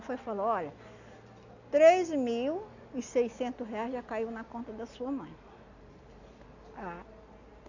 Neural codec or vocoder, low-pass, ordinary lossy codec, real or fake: none; 7.2 kHz; none; real